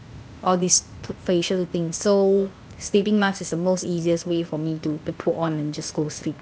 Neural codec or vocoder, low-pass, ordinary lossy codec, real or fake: codec, 16 kHz, 0.8 kbps, ZipCodec; none; none; fake